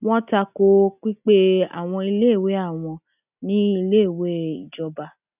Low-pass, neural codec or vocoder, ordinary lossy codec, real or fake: 3.6 kHz; none; none; real